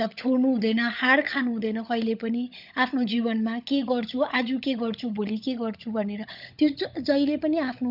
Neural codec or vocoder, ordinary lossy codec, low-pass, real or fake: codec, 16 kHz, 16 kbps, FunCodec, trained on LibriTTS, 50 frames a second; none; 5.4 kHz; fake